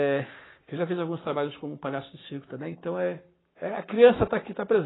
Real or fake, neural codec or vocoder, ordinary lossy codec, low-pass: fake; codec, 44.1 kHz, 7.8 kbps, Pupu-Codec; AAC, 16 kbps; 7.2 kHz